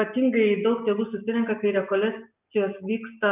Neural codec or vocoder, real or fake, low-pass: none; real; 3.6 kHz